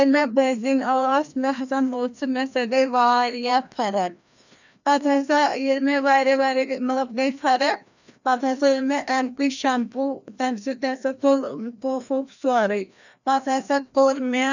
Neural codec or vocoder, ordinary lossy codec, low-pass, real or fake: codec, 16 kHz, 1 kbps, FreqCodec, larger model; none; 7.2 kHz; fake